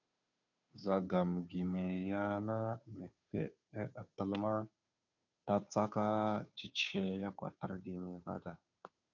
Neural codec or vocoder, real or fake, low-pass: codec, 16 kHz, 2 kbps, FunCodec, trained on Chinese and English, 25 frames a second; fake; 7.2 kHz